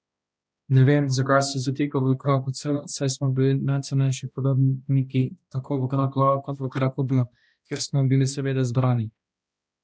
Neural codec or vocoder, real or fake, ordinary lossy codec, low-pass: codec, 16 kHz, 1 kbps, X-Codec, HuBERT features, trained on balanced general audio; fake; none; none